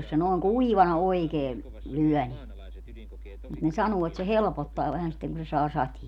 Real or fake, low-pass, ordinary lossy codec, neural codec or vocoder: real; 19.8 kHz; none; none